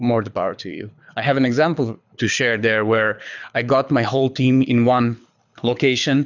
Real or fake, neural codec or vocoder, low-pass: fake; codec, 24 kHz, 6 kbps, HILCodec; 7.2 kHz